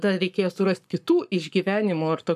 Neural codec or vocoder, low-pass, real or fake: codec, 44.1 kHz, 7.8 kbps, Pupu-Codec; 14.4 kHz; fake